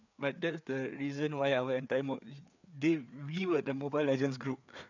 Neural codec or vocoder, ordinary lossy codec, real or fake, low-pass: codec, 16 kHz, 4 kbps, FreqCodec, larger model; none; fake; 7.2 kHz